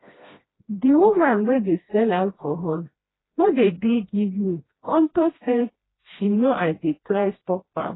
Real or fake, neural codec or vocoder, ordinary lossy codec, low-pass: fake; codec, 16 kHz, 1 kbps, FreqCodec, smaller model; AAC, 16 kbps; 7.2 kHz